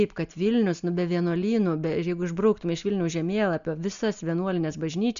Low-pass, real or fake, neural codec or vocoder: 7.2 kHz; real; none